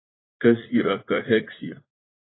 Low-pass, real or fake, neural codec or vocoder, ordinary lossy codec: 7.2 kHz; fake; vocoder, 44.1 kHz, 80 mel bands, Vocos; AAC, 16 kbps